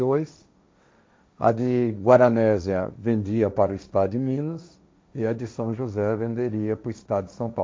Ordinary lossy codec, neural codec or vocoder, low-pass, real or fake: none; codec, 16 kHz, 1.1 kbps, Voila-Tokenizer; none; fake